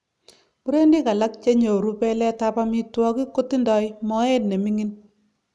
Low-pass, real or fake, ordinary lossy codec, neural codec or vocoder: none; real; none; none